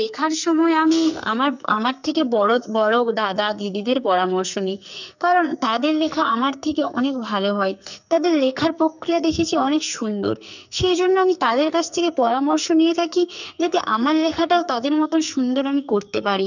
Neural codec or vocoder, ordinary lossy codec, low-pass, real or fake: codec, 44.1 kHz, 2.6 kbps, SNAC; none; 7.2 kHz; fake